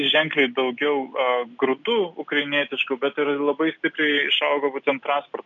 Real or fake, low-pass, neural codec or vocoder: real; 7.2 kHz; none